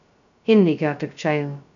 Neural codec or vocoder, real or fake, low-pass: codec, 16 kHz, 0.2 kbps, FocalCodec; fake; 7.2 kHz